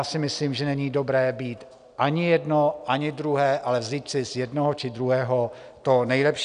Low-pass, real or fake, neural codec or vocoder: 9.9 kHz; real; none